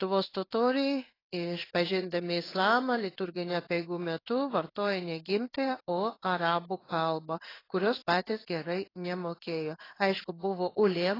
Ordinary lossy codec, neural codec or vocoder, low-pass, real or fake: AAC, 24 kbps; codec, 16 kHz in and 24 kHz out, 1 kbps, XY-Tokenizer; 5.4 kHz; fake